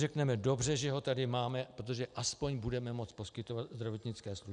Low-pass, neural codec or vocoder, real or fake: 9.9 kHz; none; real